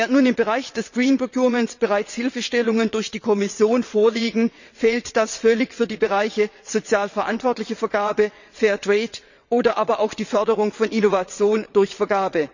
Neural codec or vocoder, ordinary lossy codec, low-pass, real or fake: vocoder, 22.05 kHz, 80 mel bands, WaveNeXt; none; 7.2 kHz; fake